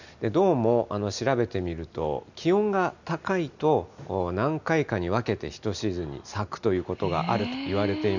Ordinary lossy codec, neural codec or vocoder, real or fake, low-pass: none; none; real; 7.2 kHz